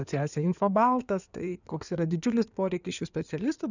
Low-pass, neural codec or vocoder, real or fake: 7.2 kHz; codec, 16 kHz in and 24 kHz out, 2.2 kbps, FireRedTTS-2 codec; fake